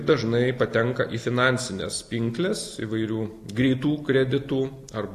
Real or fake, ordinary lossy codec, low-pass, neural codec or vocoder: real; AAC, 64 kbps; 14.4 kHz; none